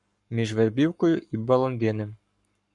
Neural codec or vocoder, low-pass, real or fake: codec, 44.1 kHz, 7.8 kbps, Pupu-Codec; 10.8 kHz; fake